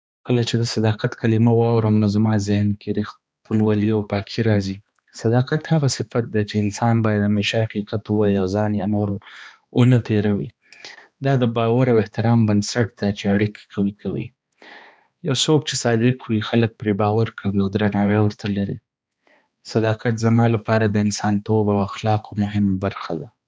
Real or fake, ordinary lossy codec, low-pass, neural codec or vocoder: fake; none; none; codec, 16 kHz, 2 kbps, X-Codec, HuBERT features, trained on balanced general audio